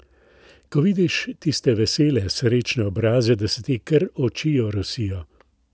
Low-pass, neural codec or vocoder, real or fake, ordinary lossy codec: none; none; real; none